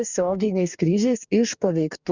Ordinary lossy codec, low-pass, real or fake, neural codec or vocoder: Opus, 64 kbps; 7.2 kHz; fake; codec, 44.1 kHz, 2.6 kbps, DAC